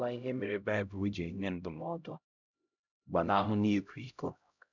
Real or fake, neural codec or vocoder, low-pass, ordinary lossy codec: fake; codec, 16 kHz, 0.5 kbps, X-Codec, HuBERT features, trained on LibriSpeech; 7.2 kHz; none